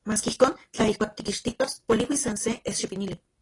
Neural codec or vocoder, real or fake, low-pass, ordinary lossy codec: none; real; 10.8 kHz; AAC, 32 kbps